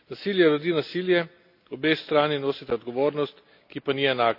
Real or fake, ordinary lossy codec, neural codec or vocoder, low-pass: real; none; none; 5.4 kHz